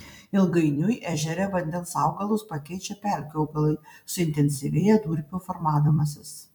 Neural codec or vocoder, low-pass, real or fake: vocoder, 44.1 kHz, 128 mel bands every 256 samples, BigVGAN v2; 19.8 kHz; fake